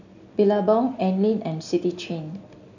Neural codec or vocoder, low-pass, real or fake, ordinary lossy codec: none; 7.2 kHz; real; none